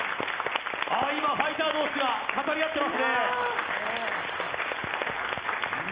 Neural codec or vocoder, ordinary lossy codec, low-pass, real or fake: none; Opus, 24 kbps; 3.6 kHz; real